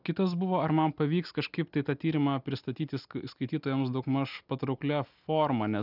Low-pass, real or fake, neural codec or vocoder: 5.4 kHz; real; none